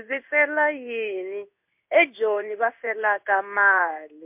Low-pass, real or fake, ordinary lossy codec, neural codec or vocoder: 3.6 kHz; fake; MP3, 32 kbps; codec, 16 kHz in and 24 kHz out, 1 kbps, XY-Tokenizer